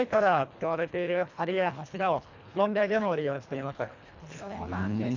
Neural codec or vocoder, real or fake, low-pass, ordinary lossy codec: codec, 24 kHz, 1.5 kbps, HILCodec; fake; 7.2 kHz; none